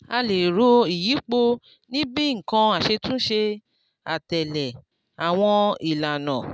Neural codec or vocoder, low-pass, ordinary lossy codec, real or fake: none; none; none; real